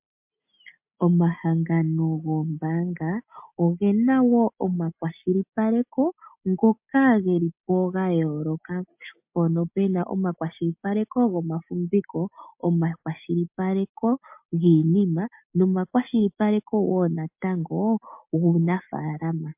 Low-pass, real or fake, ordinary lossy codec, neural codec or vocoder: 3.6 kHz; real; MP3, 32 kbps; none